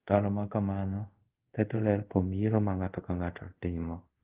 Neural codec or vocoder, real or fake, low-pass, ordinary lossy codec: codec, 24 kHz, 0.5 kbps, DualCodec; fake; 3.6 kHz; Opus, 16 kbps